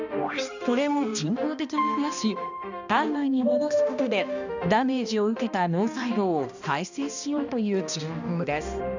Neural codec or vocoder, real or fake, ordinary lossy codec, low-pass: codec, 16 kHz, 1 kbps, X-Codec, HuBERT features, trained on balanced general audio; fake; none; 7.2 kHz